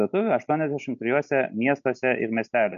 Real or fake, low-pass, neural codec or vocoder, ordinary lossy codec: real; 7.2 kHz; none; MP3, 64 kbps